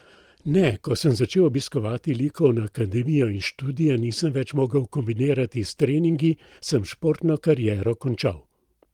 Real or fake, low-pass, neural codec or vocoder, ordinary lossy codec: fake; 19.8 kHz; vocoder, 44.1 kHz, 128 mel bands every 512 samples, BigVGAN v2; Opus, 24 kbps